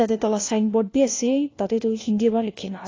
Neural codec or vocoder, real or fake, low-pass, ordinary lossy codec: codec, 16 kHz, 1 kbps, FunCodec, trained on LibriTTS, 50 frames a second; fake; 7.2 kHz; AAC, 32 kbps